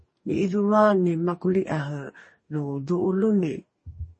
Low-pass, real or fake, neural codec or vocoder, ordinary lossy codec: 10.8 kHz; fake; codec, 44.1 kHz, 2.6 kbps, DAC; MP3, 32 kbps